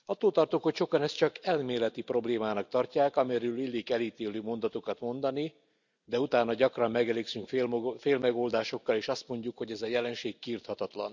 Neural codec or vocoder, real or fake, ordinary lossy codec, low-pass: none; real; none; 7.2 kHz